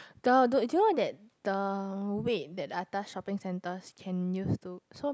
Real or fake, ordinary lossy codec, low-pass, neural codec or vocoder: real; none; none; none